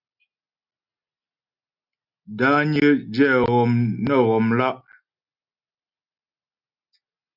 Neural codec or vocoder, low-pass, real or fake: none; 5.4 kHz; real